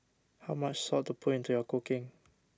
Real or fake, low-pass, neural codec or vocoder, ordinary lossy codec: real; none; none; none